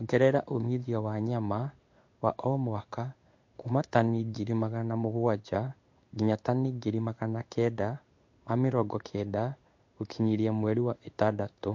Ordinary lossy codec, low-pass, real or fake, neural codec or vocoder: MP3, 48 kbps; 7.2 kHz; fake; codec, 16 kHz in and 24 kHz out, 1 kbps, XY-Tokenizer